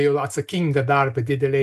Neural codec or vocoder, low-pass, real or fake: none; 14.4 kHz; real